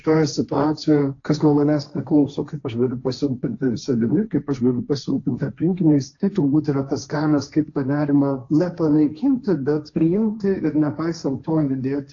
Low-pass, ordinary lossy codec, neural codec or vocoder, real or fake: 7.2 kHz; AAC, 48 kbps; codec, 16 kHz, 1.1 kbps, Voila-Tokenizer; fake